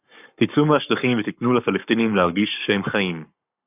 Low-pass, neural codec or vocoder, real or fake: 3.6 kHz; codec, 44.1 kHz, 7.8 kbps, Pupu-Codec; fake